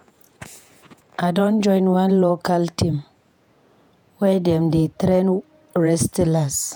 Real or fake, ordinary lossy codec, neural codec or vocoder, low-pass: fake; none; vocoder, 48 kHz, 128 mel bands, Vocos; none